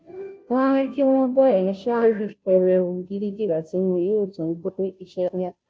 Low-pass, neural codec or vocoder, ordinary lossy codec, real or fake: none; codec, 16 kHz, 0.5 kbps, FunCodec, trained on Chinese and English, 25 frames a second; none; fake